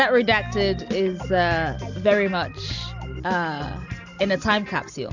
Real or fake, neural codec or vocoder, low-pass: real; none; 7.2 kHz